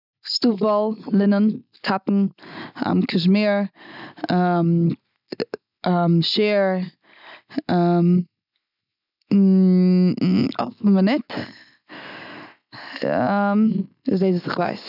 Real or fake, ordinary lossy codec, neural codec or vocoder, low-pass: real; none; none; 5.4 kHz